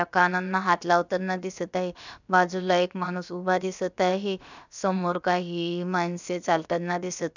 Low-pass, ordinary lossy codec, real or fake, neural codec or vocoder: 7.2 kHz; none; fake; codec, 16 kHz, about 1 kbps, DyCAST, with the encoder's durations